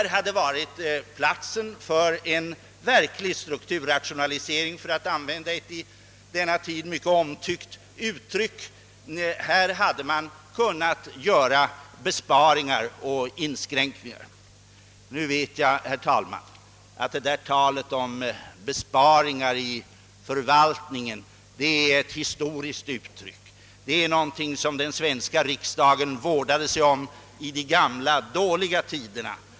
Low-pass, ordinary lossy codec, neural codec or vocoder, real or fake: none; none; none; real